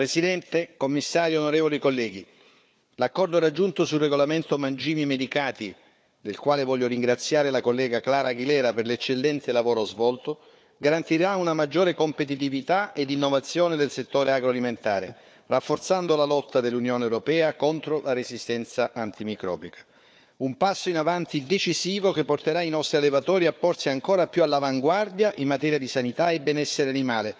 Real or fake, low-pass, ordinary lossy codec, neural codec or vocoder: fake; none; none; codec, 16 kHz, 4 kbps, FunCodec, trained on Chinese and English, 50 frames a second